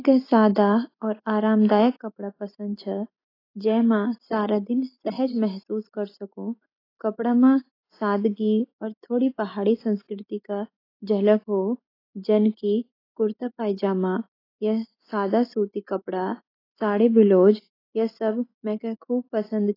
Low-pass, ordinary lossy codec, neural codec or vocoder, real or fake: 5.4 kHz; AAC, 24 kbps; none; real